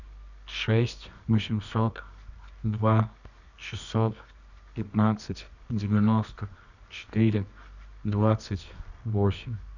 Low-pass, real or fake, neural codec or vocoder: 7.2 kHz; fake; codec, 24 kHz, 0.9 kbps, WavTokenizer, medium music audio release